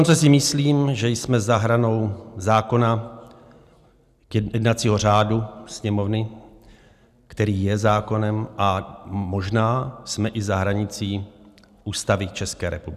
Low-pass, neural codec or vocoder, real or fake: 14.4 kHz; none; real